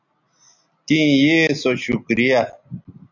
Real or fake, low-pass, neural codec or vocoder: real; 7.2 kHz; none